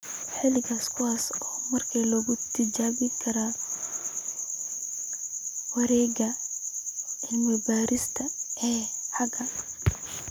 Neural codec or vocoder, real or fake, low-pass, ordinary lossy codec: none; real; none; none